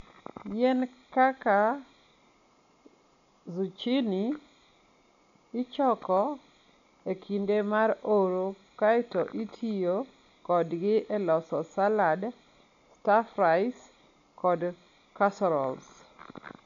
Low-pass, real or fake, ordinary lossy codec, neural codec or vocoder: 7.2 kHz; real; none; none